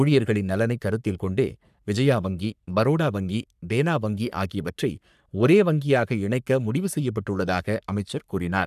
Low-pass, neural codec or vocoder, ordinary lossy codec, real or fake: 14.4 kHz; codec, 44.1 kHz, 3.4 kbps, Pupu-Codec; none; fake